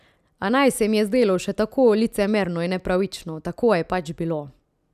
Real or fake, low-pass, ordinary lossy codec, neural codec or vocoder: real; 14.4 kHz; none; none